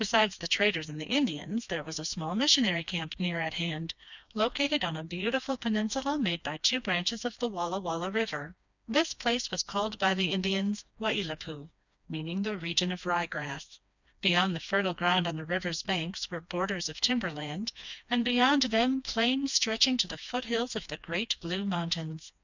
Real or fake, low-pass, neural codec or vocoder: fake; 7.2 kHz; codec, 16 kHz, 2 kbps, FreqCodec, smaller model